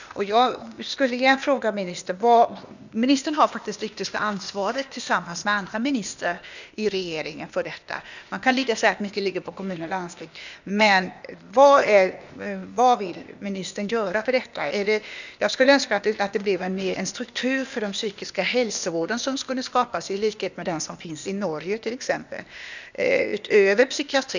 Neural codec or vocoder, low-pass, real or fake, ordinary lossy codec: codec, 16 kHz, 0.8 kbps, ZipCodec; 7.2 kHz; fake; none